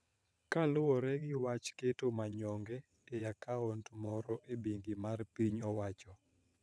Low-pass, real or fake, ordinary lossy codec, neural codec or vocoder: none; fake; none; vocoder, 22.05 kHz, 80 mel bands, WaveNeXt